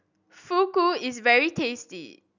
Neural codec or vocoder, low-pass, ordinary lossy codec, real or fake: none; 7.2 kHz; none; real